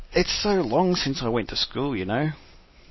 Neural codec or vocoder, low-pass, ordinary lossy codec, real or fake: none; 7.2 kHz; MP3, 24 kbps; real